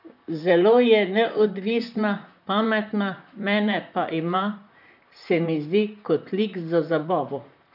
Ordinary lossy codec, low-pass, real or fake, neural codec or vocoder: none; 5.4 kHz; fake; vocoder, 44.1 kHz, 128 mel bands, Pupu-Vocoder